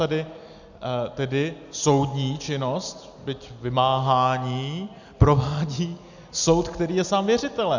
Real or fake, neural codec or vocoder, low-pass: real; none; 7.2 kHz